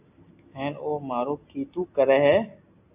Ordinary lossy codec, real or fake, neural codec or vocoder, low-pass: AAC, 32 kbps; real; none; 3.6 kHz